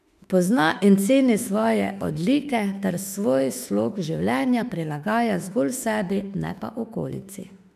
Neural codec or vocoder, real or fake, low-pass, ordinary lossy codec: autoencoder, 48 kHz, 32 numbers a frame, DAC-VAE, trained on Japanese speech; fake; 14.4 kHz; none